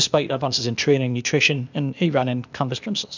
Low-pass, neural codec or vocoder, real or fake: 7.2 kHz; codec, 16 kHz, 0.8 kbps, ZipCodec; fake